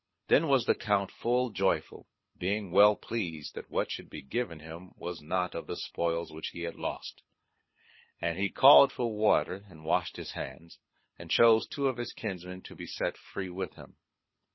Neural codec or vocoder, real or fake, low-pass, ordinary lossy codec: codec, 24 kHz, 6 kbps, HILCodec; fake; 7.2 kHz; MP3, 24 kbps